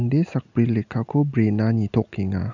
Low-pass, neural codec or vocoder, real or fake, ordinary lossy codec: 7.2 kHz; none; real; none